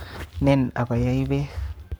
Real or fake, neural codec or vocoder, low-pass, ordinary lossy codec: fake; codec, 44.1 kHz, 7.8 kbps, Pupu-Codec; none; none